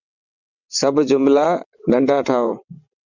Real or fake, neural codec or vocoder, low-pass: fake; vocoder, 22.05 kHz, 80 mel bands, WaveNeXt; 7.2 kHz